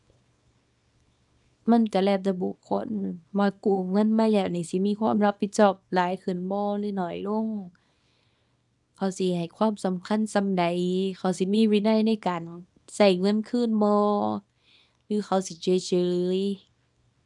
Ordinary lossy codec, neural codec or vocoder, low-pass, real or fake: none; codec, 24 kHz, 0.9 kbps, WavTokenizer, small release; 10.8 kHz; fake